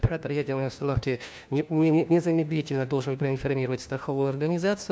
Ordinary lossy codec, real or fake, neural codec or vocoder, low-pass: none; fake; codec, 16 kHz, 1 kbps, FunCodec, trained on LibriTTS, 50 frames a second; none